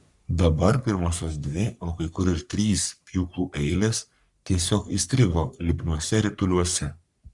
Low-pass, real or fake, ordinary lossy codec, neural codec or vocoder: 10.8 kHz; fake; Opus, 64 kbps; codec, 44.1 kHz, 3.4 kbps, Pupu-Codec